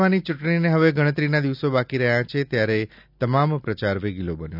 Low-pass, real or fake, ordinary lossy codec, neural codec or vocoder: 5.4 kHz; real; none; none